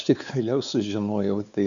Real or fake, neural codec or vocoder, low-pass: fake; codec, 16 kHz, 4 kbps, X-Codec, WavLM features, trained on Multilingual LibriSpeech; 7.2 kHz